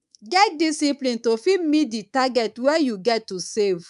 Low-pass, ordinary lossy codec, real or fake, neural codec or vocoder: 10.8 kHz; none; fake; codec, 24 kHz, 3.1 kbps, DualCodec